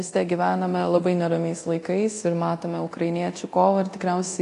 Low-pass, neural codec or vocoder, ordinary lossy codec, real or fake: 10.8 kHz; codec, 24 kHz, 0.9 kbps, DualCodec; AAC, 48 kbps; fake